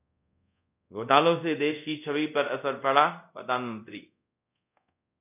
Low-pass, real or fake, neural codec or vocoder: 3.6 kHz; fake; codec, 24 kHz, 0.5 kbps, DualCodec